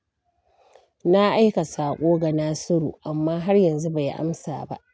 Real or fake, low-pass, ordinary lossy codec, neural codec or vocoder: real; none; none; none